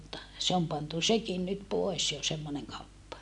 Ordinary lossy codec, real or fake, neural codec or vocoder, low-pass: none; real; none; 10.8 kHz